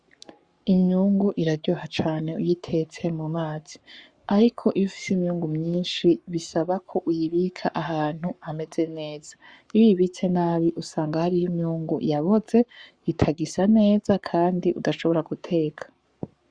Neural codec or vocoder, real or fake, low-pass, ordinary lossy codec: codec, 44.1 kHz, 7.8 kbps, Pupu-Codec; fake; 9.9 kHz; Opus, 64 kbps